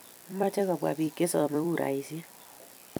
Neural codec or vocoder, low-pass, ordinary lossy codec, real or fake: vocoder, 44.1 kHz, 128 mel bands every 256 samples, BigVGAN v2; none; none; fake